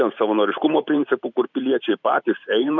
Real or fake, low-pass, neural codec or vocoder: fake; 7.2 kHz; vocoder, 44.1 kHz, 128 mel bands every 512 samples, BigVGAN v2